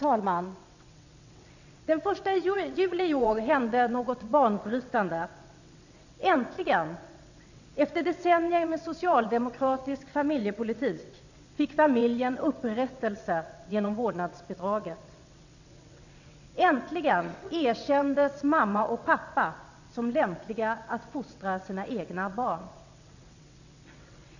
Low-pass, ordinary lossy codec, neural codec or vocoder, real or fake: 7.2 kHz; none; vocoder, 22.05 kHz, 80 mel bands, WaveNeXt; fake